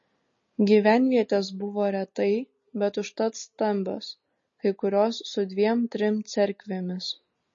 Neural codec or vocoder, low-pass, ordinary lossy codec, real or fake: none; 7.2 kHz; MP3, 32 kbps; real